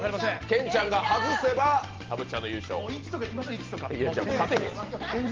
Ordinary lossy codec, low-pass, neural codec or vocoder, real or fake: Opus, 16 kbps; 7.2 kHz; none; real